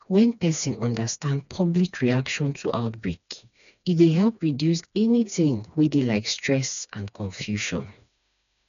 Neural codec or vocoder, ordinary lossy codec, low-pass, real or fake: codec, 16 kHz, 2 kbps, FreqCodec, smaller model; none; 7.2 kHz; fake